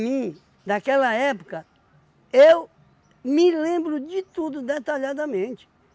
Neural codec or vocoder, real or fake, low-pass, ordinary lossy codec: none; real; none; none